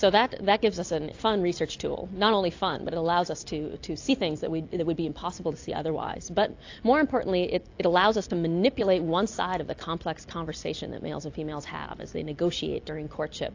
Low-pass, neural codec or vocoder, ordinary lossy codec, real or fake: 7.2 kHz; none; AAC, 48 kbps; real